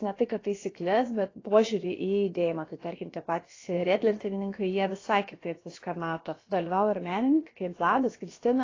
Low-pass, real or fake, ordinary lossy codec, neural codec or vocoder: 7.2 kHz; fake; AAC, 32 kbps; codec, 16 kHz, about 1 kbps, DyCAST, with the encoder's durations